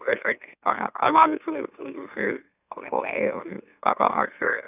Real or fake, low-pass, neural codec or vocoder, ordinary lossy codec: fake; 3.6 kHz; autoencoder, 44.1 kHz, a latent of 192 numbers a frame, MeloTTS; none